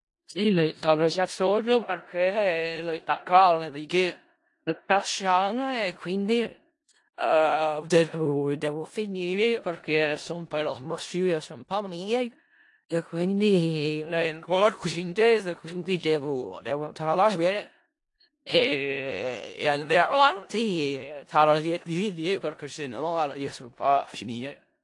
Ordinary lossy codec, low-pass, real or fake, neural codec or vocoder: AAC, 48 kbps; 10.8 kHz; fake; codec, 16 kHz in and 24 kHz out, 0.4 kbps, LongCat-Audio-Codec, four codebook decoder